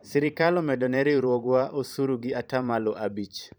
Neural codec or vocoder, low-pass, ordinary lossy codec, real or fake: vocoder, 44.1 kHz, 128 mel bands every 512 samples, BigVGAN v2; none; none; fake